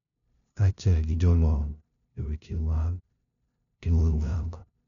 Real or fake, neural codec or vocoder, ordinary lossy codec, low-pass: fake; codec, 16 kHz, 0.5 kbps, FunCodec, trained on LibriTTS, 25 frames a second; none; 7.2 kHz